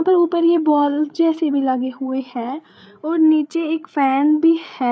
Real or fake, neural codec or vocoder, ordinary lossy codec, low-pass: fake; codec, 16 kHz, 8 kbps, FreqCodec, larger model; none; none